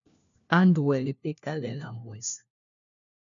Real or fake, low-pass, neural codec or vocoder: fake; 7.2 kHz; codec, 16 kHz, 1 kbps, FunCodec, trained on LibriTTS, 50 frames a second